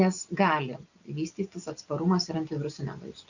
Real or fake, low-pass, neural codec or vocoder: real; 7.2 kHz; none